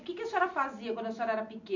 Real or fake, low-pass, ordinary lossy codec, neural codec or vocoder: real; 7.2 kHz; none; none